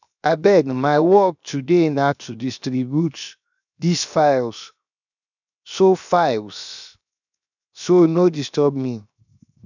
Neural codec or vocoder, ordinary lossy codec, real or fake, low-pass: codec, 16 kHz, 0.7 kbps, FocalCodec; none; fake; 7.2 kHz